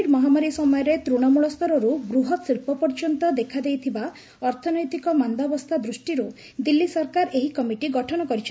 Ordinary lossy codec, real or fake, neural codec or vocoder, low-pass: none; real; none; none